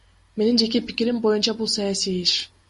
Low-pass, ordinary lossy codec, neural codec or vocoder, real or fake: 14.4 kHz; MP3, 48 kbps; none; real